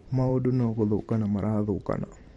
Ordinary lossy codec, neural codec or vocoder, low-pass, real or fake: MP3, 48 kbps; vocoder, 44.1 kHz, 128 mel bands every 512 samples, BigVGAN v2; 19.8 kHz; fake